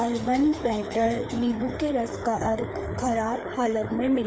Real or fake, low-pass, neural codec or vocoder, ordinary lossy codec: fake; none; codec, 16 kHz, 4 kbps, FreqCodec, larger model; none